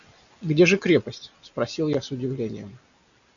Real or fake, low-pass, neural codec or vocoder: real; 7.2 kHz; none